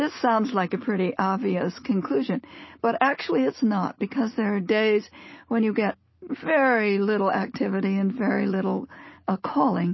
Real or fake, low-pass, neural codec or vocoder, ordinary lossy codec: real; 7.2 kHz; none; MP3, 24 kbps